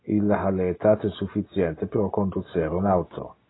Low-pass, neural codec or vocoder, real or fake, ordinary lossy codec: 7.2 kHz; none; real; AAC, 16 kbps